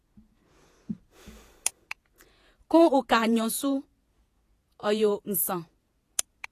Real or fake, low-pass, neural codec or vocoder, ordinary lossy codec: fake; 14.4 kHz; vocoder, 48 kHz, 128 mel bands, Vocos; AAC, 48 kbps